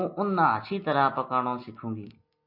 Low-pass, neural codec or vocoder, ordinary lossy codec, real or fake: 5.4 kHz; none; MP3, 32 kbps; real